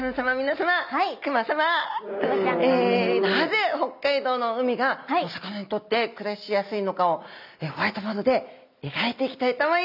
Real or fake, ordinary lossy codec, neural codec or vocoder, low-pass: real; none; none; 5.4 kHz